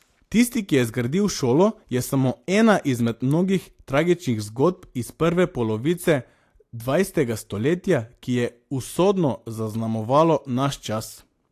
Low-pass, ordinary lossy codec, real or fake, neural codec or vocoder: 14.4 kHz; AAC, 64 kbps; real; none